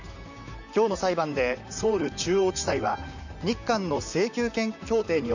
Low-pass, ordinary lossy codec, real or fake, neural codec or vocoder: 7.2 kHz; none; fake; vocoder, 44.1 kHz, 128 mel bands, Pupu-Vocoder